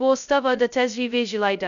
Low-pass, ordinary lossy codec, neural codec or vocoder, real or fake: 7.2 kHz; none; codec, 16 kHz, 0.2 kbps, FocalCodec; fake